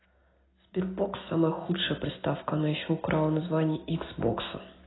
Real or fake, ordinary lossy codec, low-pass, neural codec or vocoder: real; AAC, 16 kbps; 7.2 kHz; none